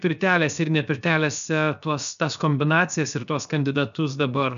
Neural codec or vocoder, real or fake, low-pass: codec, 16 kHz, 0.7 kbps, FocalCodec; fake; 7.2 kHz